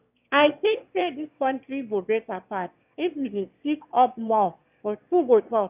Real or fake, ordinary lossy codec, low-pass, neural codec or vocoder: fake; none; 3.6 kHz; autoencoder, 22.05 kHz, a latent of 192 numbers a frame, VITS, trained on one speaker